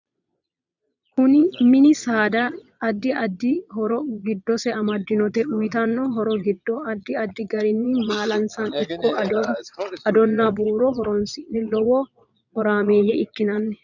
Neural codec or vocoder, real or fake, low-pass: vocoder, 22.05 kHz, 80 mel bands, Vocos; fake; 7.2 kHz